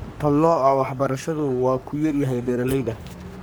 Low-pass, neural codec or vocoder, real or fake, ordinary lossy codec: none; codec, 44.1 kHz, 3.4 kbps, Pupu-Codec; fake; none